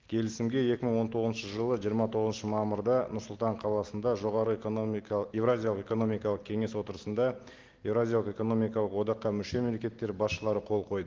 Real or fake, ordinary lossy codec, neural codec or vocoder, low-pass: real; Opus, 16 kbps; none; 7.2 kHz